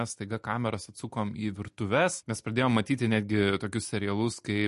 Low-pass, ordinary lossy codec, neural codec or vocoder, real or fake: 14.4 kHz; MP3, 48 kbps; none; real